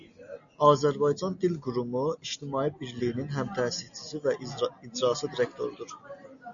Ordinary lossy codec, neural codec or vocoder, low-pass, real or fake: MP3, 96 kbps; none; 7.2 kHz; real